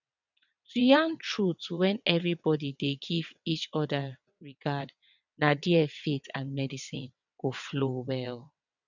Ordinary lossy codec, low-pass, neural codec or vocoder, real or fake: none; 7.2 kHz; vocoder, 22.05 kHz, 80 mel bands, WaveNeXt; fake